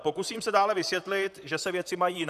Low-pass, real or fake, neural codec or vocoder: 14.4 kHz; fake; vocoder, 44.1 kHz, 128 mel bands, Pupu-Vocoder